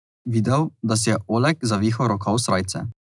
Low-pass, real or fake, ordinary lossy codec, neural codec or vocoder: 10.8 kHz; real; none; none